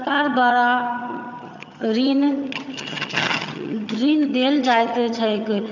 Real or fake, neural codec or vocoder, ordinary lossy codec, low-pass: fake; vocoder, 22.05 kHz, 80 mel bands, HiFi-GAN; none; 7.2 kHz